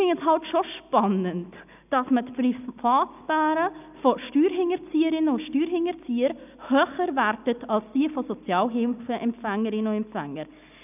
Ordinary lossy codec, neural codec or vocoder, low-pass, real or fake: none; none; 3.6 kHz; real